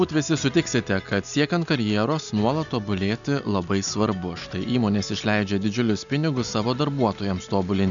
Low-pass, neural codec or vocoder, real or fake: 7.2 kHz; none; real